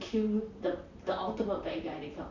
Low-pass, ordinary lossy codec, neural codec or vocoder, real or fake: 7.2 kHz; none; vocoder, 44.1 kHz, 128 mel bands, Pupu-Vocoder; fake